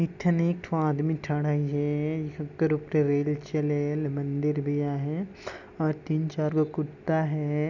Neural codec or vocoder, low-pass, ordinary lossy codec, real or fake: none; 7.2 kHz; none; real